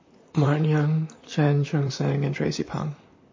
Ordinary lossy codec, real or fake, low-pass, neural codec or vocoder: MP3, 32 kbps; fake; 7.2 kHz; vocoder, 44.1 kHz, 128 mel bands, Pupu-Vocoder